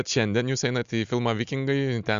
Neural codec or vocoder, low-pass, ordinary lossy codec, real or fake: none; 7.2 kHz; Opus, 64 kbps; real